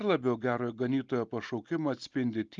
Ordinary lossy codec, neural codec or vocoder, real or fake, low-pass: Opus, 24 kbps; none; real; 7.2 kHz